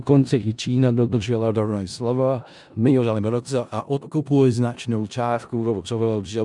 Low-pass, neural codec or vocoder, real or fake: 10.8 kHz; codec, 16 kHz in and 24 kHz out, 0.4 kbps, LongCat-Audio-Codec, four codebook decoder; fake